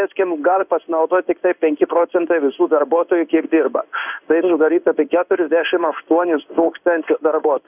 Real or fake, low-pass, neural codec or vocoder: fake; 3.6 kHz; codec, 16 kHz in and 24 kHz out, 1 kbps, XY-Tokenizer